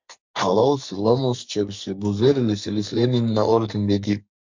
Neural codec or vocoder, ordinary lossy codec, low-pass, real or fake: codec, 44.1 kHz, 2.6 kbps, SNAC; MP3, 64 kbps; 7.2 kHz; fake